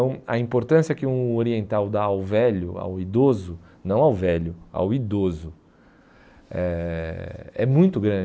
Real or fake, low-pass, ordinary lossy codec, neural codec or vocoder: real; none; none; none